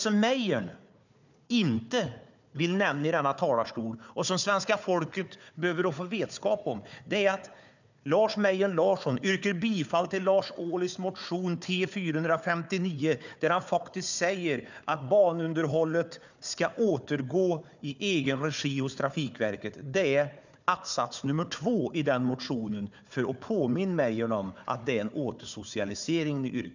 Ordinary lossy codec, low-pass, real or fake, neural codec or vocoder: none; 7.2 kHz; fake; codec, 16 kHz, 4 kbps, FunCodec, trained on Chinese and English, 50 frames a second